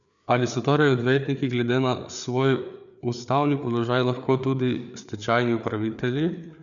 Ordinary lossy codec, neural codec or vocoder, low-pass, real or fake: none; codec, 16 kHz, 4 kbps, FreqCodec, larger model; 7.2 kHz; fake